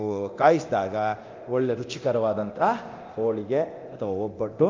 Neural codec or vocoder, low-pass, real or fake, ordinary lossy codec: codec, 24 kHz, 0.9 kbps, DualCodec; 7.2 kHz; fake; Opus, 24 kbps